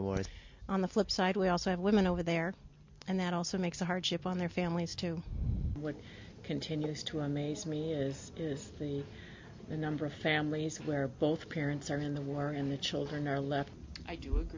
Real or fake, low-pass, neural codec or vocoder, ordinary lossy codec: real; 7.2 kHz; none; MP3, 48 kbps